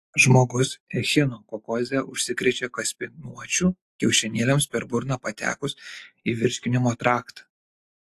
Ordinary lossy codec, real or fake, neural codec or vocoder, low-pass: AAC, 64 kbps; real; none; 14.4 kHz